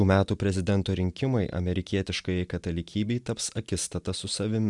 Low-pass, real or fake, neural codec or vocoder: 10.8 kHz; real; none